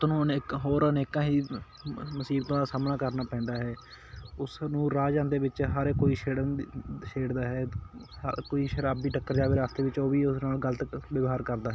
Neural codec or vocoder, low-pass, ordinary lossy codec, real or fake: none; none; none; real